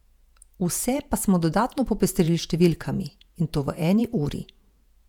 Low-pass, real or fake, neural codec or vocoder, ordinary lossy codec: 19.8 kHz; fake; vocoder, 48 kHz, 128 mel bands, Vocos; none